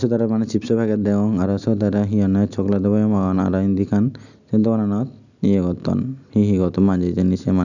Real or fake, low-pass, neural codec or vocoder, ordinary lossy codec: real; 7.2 kHz; none; none